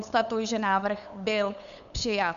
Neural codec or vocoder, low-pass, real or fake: codec, 16 kHz, 8 kbps, FunCodec, trained on LibriTTS, 25 frames a second; 7.2 kHz; fake